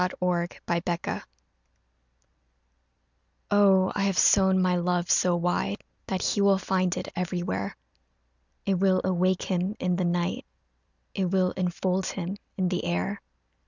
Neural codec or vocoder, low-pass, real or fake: none; 7.2 kHz; real